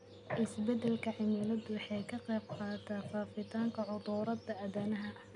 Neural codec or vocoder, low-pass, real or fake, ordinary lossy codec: none; 10.8 kHz; real; none